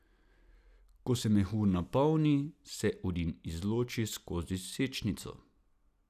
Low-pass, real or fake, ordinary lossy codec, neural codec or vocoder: 14.4 kHz; real; none; none